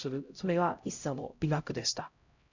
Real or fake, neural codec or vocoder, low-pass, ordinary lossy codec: fake; codec, 16 kHz, 0.5 kbps, X-Codec, HuBERT features, trained on LibriSpeech; 7.2 kHz; none